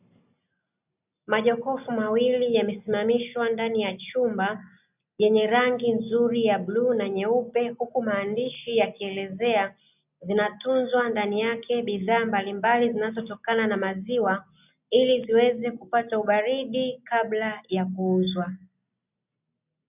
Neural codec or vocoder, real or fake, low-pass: none; real; 3.6 kHz